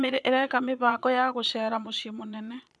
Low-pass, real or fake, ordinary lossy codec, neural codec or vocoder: none; fake; none; vocoder, 22.05 kHz, 80 mel bands, Vocos